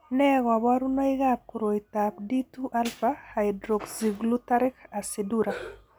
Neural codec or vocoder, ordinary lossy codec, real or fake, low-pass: none; none; real; none